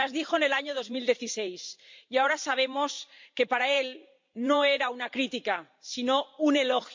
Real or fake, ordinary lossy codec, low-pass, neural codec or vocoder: fake; MP3, 64 kbps; 7.2 kHz; vocoder, 44.1 kHz, 128 mel bands every 512 samples, BigVGAN v2